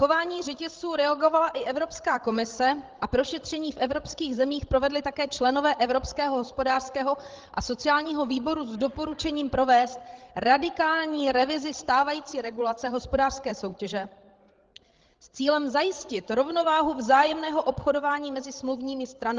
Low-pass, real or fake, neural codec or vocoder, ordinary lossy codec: 7.2 kHz; fake; codec, 16 kHz, 16 kbps, FreqCodec, larger model; Opus, 16 kbps